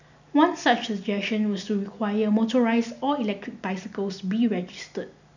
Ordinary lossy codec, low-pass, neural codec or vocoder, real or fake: none; 7.2 kHz; none; real